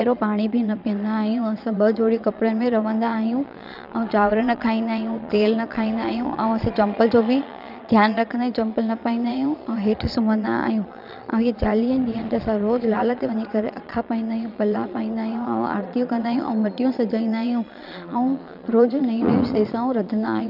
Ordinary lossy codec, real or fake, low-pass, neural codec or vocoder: none; fake; 5.4 kHz; vocoder, 22.05 kHz, 80 mel bands, Vocos